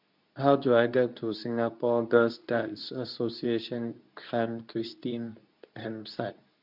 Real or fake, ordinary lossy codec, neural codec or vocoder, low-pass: fake; none; codec, 24 kHz, 0.9 kbps, WavTokenizer, medium speech release version 2; 5.4 kHz